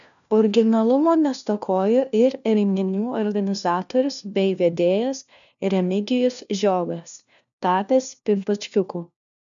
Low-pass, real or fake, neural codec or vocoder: 7.2 kHz; fake; codec, 16 kHz, 1 kbps, FunCodec, trained on LibriTTS, 50 frames a second